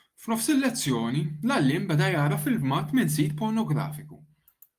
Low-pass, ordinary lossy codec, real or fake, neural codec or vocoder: 14.4 kHz; Opus, 32 kbps; real; none